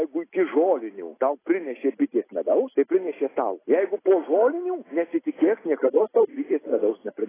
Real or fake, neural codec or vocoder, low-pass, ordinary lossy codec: real; none; 3.6 kHz; AAC, 16 kbps